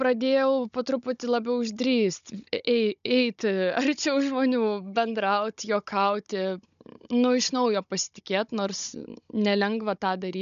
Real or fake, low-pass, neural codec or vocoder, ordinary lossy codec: fake; 7.2 kHz; codec, 16 kHz, 16 kbps, FunCodec, trained on Chinese and English, 50 frames a second; MP3, 96 kbps